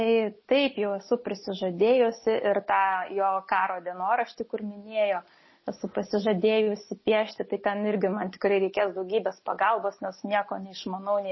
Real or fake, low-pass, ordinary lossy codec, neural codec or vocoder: real; 7.2 kHz; MP3, 24 kbps; none